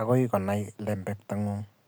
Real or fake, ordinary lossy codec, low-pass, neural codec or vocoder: real; none; none; none